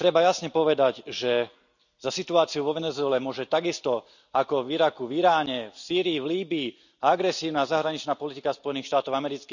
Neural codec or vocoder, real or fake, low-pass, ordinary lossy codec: none; real; 7.2 kHz; none